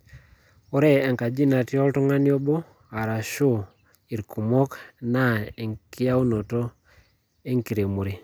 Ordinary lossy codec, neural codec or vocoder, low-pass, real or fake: none; none; none; real